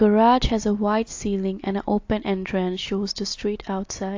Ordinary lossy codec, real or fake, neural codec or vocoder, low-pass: AAC, 48 kbps; real; none; 7.2 kHz